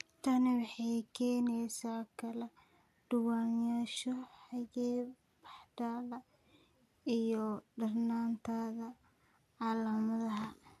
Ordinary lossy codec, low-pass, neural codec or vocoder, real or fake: none; 14.4 kHz; none; real